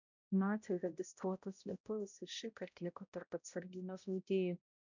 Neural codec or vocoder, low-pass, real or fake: codec, 16 kHz, 0.5 kbps, X-Codec, HuBERT features, trained on balanced general audio; 7.2 kHz; fake